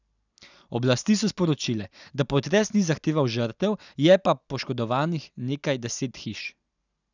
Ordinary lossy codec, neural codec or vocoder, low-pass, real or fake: none; none; 7.2 kHz; real